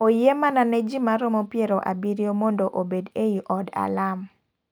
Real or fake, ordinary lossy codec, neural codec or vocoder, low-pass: real; none; none; none